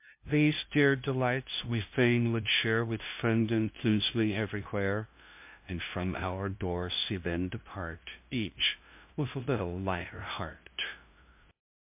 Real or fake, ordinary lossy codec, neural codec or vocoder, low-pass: fake; MP3, 32 kbps; codec, 16 kHz, 0.5 kbps, FunCodec, trained on LibriTTS, 25 frames a second; 3.6 kHz